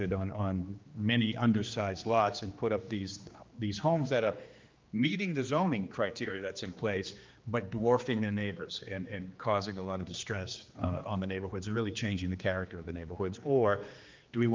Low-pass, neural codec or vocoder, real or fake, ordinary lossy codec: 7.2 kHz; codec, 16 kHz, 2 kbps, X-Codec, HuBERT features, trained on general audio; fake; Opus, 24 kbps